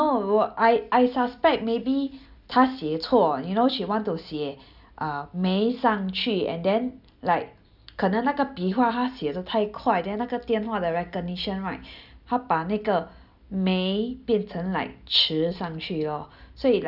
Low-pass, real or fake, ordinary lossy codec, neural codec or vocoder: 5.4 kHz; real; none; none